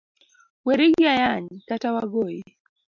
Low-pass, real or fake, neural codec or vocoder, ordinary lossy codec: 7.2 kHz; real; none; MP3, 64 kbps